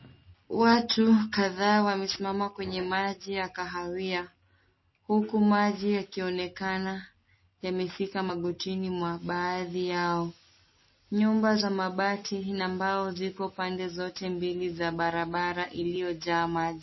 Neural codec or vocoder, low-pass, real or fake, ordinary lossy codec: none; 7.2 kHz; real; MP3, 24 kbps